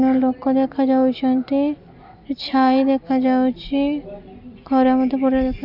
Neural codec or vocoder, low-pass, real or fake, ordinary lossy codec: none; 5.4 kHz; real; none